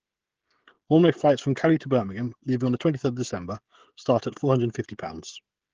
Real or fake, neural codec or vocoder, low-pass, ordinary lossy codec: fake; codec, 16 kHz, 16 kbps, FreqCodec, smaller model; 7.2 kHz; Opus, 16 kbps